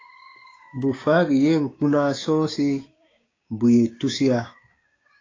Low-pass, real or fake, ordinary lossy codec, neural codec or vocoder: 7.2 kHz; fake; AAC, 32 kbps; codec, 16 kHz, 6 kbps, DAC